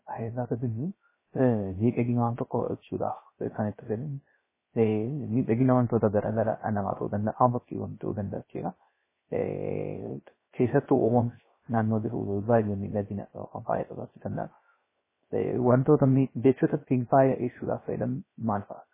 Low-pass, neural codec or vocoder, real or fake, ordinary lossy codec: 3.6 kHz; codec, 16 kHz, 0.3 kbps, FocalCodec; fake; MP3, 16 kbps